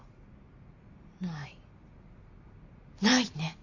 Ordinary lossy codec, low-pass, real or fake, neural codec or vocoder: Opus, 64 kbps; 7.2 kHz; real; none